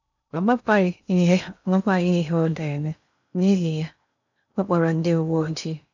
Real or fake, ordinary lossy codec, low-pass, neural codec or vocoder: fake; none; 7.2 kHz; codec, 16 kHz in and 24 kHz out, 0.6 kbps, FocalCodec, streaming, 2048 codes